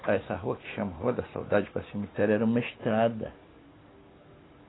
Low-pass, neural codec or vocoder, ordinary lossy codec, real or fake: 7.2 kHz; none; AAC, 16 kbps; real